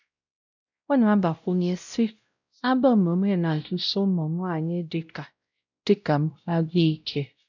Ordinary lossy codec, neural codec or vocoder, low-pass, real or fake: none; codec, 16 kHz, 0.5 kbps, X-Codec, WavLM features, trained on Multilingual LibriSpeech; 7.2 kHz; fake